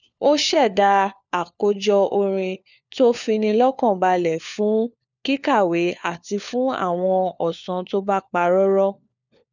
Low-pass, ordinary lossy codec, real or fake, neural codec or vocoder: 7.2 kHz; none; fake; codec, 16 kHz, 4 kbps, FunCodec, trained on LibriTTS, 50 frames a second